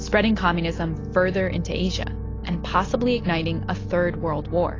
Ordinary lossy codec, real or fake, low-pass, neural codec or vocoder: AAC, 32 kbps; real; 7.2 kHz; none